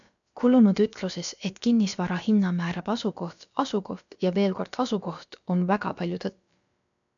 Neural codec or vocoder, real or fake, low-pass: codec, 16 kHz, about 1 kbps, DyCAST, with the encoder's durations; fake; 7.2 kHz